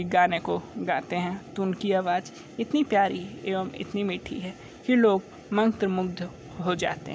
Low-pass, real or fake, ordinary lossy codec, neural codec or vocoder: none; real; none; none